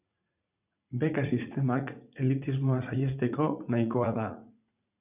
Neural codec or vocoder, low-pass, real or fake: vocoder, 44.1 kHz, 80 mel bands, Vocos; 3.6 kHz; fake